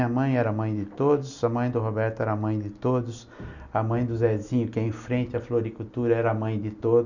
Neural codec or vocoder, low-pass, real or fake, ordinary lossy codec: none; 7.2 kHz; real; none